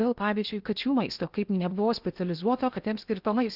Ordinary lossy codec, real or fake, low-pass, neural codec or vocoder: Opus, 64 kbps; fake; 5.4 kHz; codec, 16 kHz in and 24 kHz out, 0.8 kbps, FocalCodec, streaming, 65536 codes